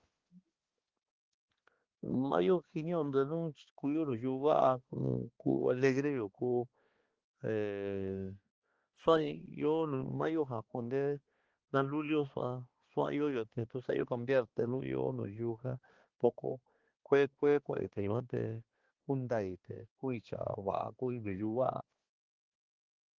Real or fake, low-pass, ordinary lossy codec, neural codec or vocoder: fake; 7.2 kHz; Opus, 16 kbps; codec, 16 kHz, 2 kbps, X-Codec, HuBERT features, trained on balanced general audio